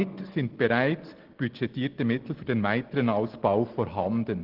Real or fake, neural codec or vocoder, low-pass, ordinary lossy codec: real; none; 5.4 kHz; Opus, 16 kbps